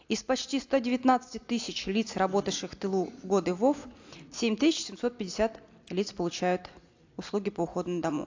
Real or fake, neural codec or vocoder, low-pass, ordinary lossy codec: real; none; 7.2 kHz; AAC, 48 kbps